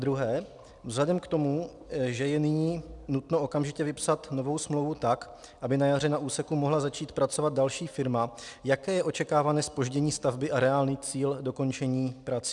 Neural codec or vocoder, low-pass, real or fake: none; 10.8 kHz; real